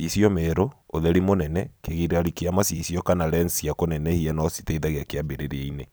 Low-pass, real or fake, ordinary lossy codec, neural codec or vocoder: none; fake; none; vocoder, 44.1 kHz, 128 mel bands every 256 samples, BigVGAN v2